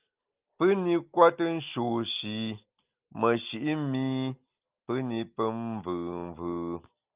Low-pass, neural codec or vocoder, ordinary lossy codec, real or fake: 3.6 kHz; none; Opus, 32 kbps; real